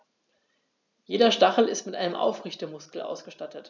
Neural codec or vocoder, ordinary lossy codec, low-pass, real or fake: none; none; 7.2 kHz; real